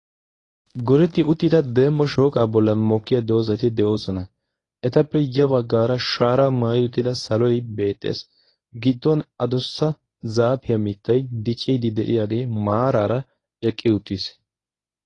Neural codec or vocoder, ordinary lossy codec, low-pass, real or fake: codec, 24 kHz, 0.9 kbps, WavTokenizer, medium speech release version 1; AAC, 32 kbps; 10.8 kHz; fake